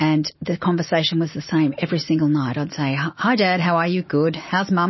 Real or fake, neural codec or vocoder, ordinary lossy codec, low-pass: real; none; MP3, 24 kbps; 7.2 kHz